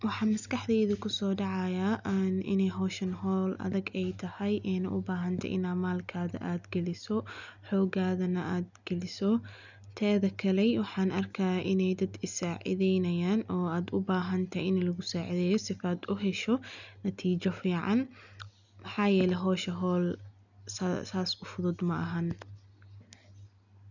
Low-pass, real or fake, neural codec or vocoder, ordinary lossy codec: 7.2 kHz; real; none; none